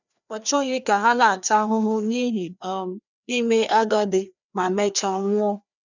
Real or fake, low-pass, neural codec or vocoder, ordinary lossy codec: fake; 7.2 kHz; codec, 16 kHz, 2 kbps, FreqCodec, larger model; none